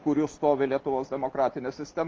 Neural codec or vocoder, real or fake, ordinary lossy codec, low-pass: none; real; Opus, 32 kbps; 7.2 kHz